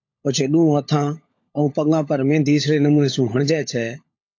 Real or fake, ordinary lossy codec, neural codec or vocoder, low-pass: fake; AAC, 48 kbps; codec, 16 kHz, 16 kbps, FunCodec, trained on LibriTTS, 50 frames a second; 7.2 kHz